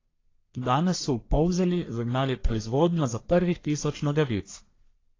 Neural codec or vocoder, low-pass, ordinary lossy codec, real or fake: codec, 44.1 kHz, 1.7 kbps, Pupu-Codec; 7.2 kHz; AAC, 32 kbps; fake